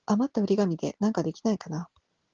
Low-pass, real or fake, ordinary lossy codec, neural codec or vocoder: 7.2 kHz; real; Opus, 16 kbps; none